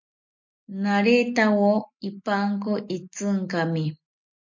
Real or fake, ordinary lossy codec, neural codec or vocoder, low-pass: real; MP3, 48 kbps; none; 7.2 kHz